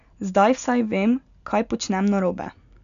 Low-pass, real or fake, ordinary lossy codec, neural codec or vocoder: 7.2 kHz; real; none; none